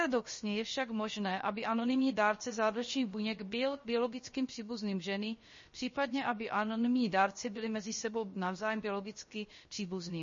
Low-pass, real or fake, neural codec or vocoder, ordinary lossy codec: 7.2 kHz; fake; codec, 16 kHz, about 1 kbps, DyCAST, with the encoder's durations; MP3, 32 kbps